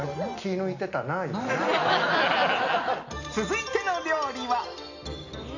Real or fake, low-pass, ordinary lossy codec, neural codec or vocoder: real; 7.2 kHz; none; none